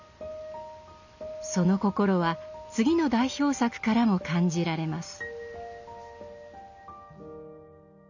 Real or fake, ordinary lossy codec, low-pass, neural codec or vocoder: real; none; 7.2 kHz; none